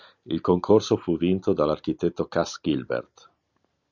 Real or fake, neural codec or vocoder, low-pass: real; none; 7.2 kHz